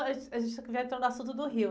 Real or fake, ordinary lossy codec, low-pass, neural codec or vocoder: real; none; none; none